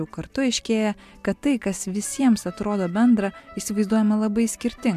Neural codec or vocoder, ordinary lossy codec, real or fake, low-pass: none; MP3, 96 kbps; real; 14.4 kHz